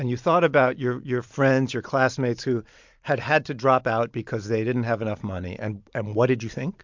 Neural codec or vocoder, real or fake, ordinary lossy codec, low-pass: vocoder, 44.1 kHz, 128 mel bands every 256 samples, BigVGAN v2; fake; MP3, 64 kbps; 7.2 kHz